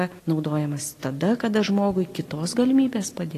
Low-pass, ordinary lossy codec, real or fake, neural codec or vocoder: 14.4 kHz; AAC, 48 kbps; real; none